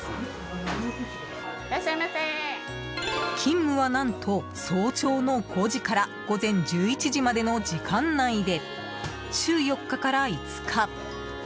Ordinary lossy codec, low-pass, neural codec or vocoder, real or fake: none; none; none; real